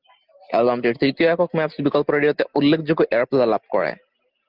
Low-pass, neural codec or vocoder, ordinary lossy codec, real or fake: 5.4 kHz; none; Opus, 32 kbps; real